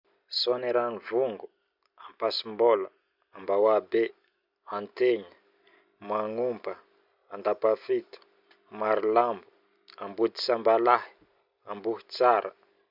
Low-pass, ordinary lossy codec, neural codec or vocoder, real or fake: 5.4 kHz; none; none; real